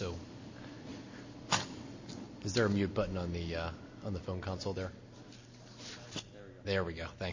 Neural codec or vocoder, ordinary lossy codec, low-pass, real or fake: none; MP3, 32 kbps; 7.2 kHz; real